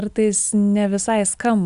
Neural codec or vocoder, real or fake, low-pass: none; real; 10.8 kHz